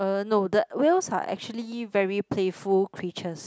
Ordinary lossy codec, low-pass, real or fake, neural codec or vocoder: none; none; real; none